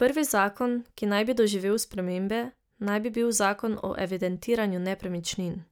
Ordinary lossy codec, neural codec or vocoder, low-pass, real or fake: none; none; none; real